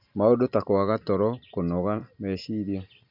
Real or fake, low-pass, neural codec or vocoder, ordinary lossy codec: real; 5.4 kHz; none; none